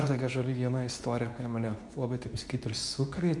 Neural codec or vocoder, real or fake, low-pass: codec, 24 kHz, 0.9 kbps, WavTokenizer, medium speech release version 2; fake; 10.8 kHz